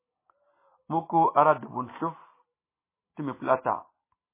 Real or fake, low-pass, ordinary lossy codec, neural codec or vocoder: real; 3.6 kHz; MP3, 16 kbps; none